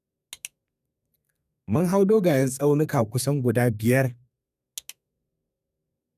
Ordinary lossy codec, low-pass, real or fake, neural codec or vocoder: none; 14.4 kHz; fake; codec, 32 kHz, 1.9 kbps, SNAC